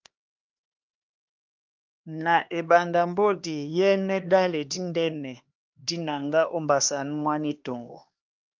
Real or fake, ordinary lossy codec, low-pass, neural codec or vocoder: fake; Opus, 24 kbps; 7.2 kHz; codec, 16 kHz, 2 kbps, X-Codec, HuBERT features, trained on LibriSpeech